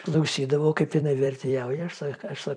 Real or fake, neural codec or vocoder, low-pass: fake; vocoder, 44.1 kHz, 128 mel bands every 256 samples, BigVGAN v2; 9.9 kHz